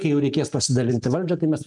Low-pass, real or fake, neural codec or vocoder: 10.8 kHz; real; none